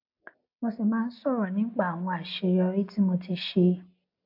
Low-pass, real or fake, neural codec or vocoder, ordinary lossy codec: 5.4 kHz; real; none; AAC, 48 kbps